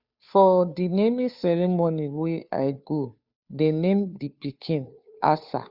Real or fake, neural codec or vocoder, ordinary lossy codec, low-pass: fake; codec, 16 kHz, 2 kbps, FunCodec, trained on Chinese and English, 25 frames a second; Opus, 64 kbps; 5.4 kHz